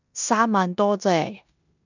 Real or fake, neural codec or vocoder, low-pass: fake; codec, 16 kHz in and 24 kHz out, 0.9 kbps, LongCat-Audio-Codec, four codebook decoder; 7.2 kHz